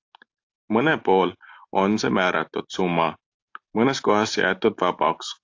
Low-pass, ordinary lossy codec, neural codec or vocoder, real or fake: 7.2 kHz; AAC, 48 kbps; none; real